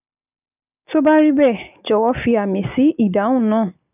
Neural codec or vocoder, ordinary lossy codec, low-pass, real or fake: none; none; 3.6 kHz; real